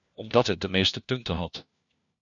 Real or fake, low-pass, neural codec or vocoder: fake; 7.2 kHz; codec, 16 kHz, 1 kbps, FunCodec, trained on LibriTTS, 50 frames a second